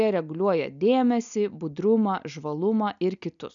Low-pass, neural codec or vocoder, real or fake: 7.2 kHz; none; real